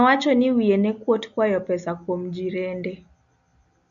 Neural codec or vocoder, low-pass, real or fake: none; 7.2 kHz; real